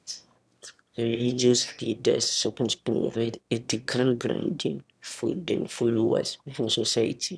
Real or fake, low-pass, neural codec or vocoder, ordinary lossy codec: fake; none; autoencoder, 22.05 kHz, a latent of 192 numbers a frame, VITS, trained on one speaker; none